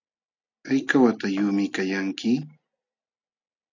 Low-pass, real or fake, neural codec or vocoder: 7.2 kHz; real; none